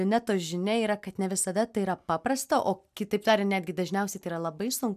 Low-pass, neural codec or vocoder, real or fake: 14.4 kHz; none; real